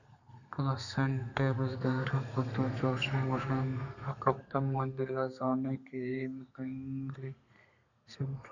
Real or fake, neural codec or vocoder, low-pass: fake; codec, 32 kHz, 1.9 kbps, SNAC; 7.2 kHz